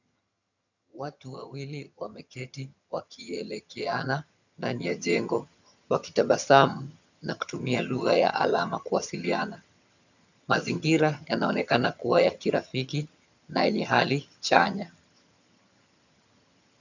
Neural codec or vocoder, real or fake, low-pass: vocoder, 22.05 kHz, 80 mel bands, HiFi-GAN; fake; 7.2 kHz